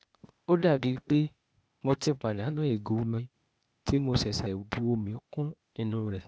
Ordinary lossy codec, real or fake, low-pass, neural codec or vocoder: none; fake; none; codec, 16 kHz, 0.8 kbps, ZipCodec